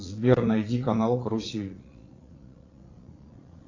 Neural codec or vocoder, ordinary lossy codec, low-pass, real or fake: vocoder, 22.05 kHz, 80 mel bands, Vocos; AAC, 32 kbps; 7.2 kHz; fake